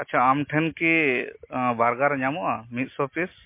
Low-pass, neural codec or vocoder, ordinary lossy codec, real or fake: 3.6 kHz; none; MP3, 24 kbps; real